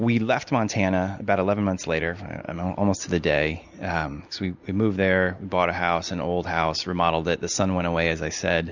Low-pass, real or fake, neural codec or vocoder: 7.2 kHz; real; none